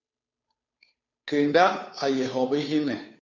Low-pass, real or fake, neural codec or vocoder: 7.2 kHz; fake; codec, 16 kHz, 2 kbps, FunCodec, trained on Chinese and English, 25 frames a second